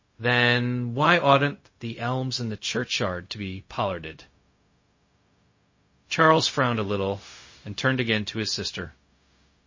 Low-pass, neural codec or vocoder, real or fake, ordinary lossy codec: 7.2 kHz; codec, 16 kHz, 0.4 kbps, LongCat-Audio-Codec; fake; MP3, 32 kbps